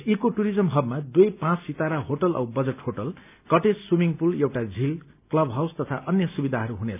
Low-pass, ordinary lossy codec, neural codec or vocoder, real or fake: 3.6 kHz; none; none; real